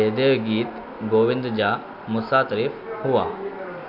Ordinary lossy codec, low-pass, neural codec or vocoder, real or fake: MP3, 48 kbps; 5.4 kHz; none; real